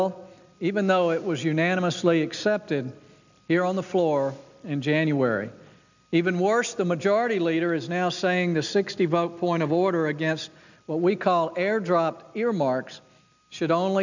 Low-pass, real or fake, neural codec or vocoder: 7.2 kHz; real; none